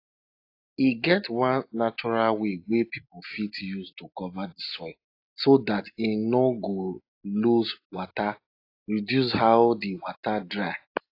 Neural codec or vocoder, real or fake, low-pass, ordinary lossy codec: none; real; 5.4 kHz; AAC, 32 kbps